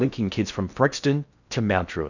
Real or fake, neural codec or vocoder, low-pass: fake; codec, 16 kHz in and 24 kHz out, 0.6 kbps, FocalCodec, streaming, 4096 codes; 7.2 kHz